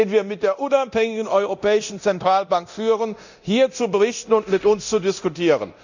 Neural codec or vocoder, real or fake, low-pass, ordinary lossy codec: codec, 24 kHz, 0.9 kbps, DualCodec; fake; 7.2 kHz; none